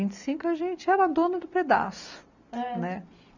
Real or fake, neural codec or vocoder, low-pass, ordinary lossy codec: real; none; 7.2 kHz; none